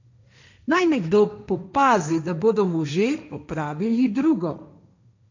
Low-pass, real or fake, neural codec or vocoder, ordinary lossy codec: 7.2 kHz; fake; codec, 16 kHz, 1.1 kbps, Voila-Tokenizer; none